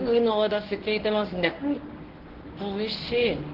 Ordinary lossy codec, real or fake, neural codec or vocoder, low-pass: Opus, 16 kbps; fake; codec, 24 kHz, 0.9 kbps, WavTokenizer, medium speech release version 1; 5.4 kHz